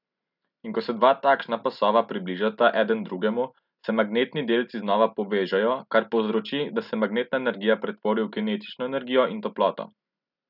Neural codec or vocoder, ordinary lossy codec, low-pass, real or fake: none; none; 5.4 kHz; real